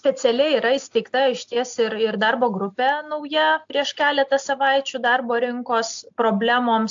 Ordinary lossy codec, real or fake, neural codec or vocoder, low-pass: AAC, 64 kbps; real; none; 7.2 kHz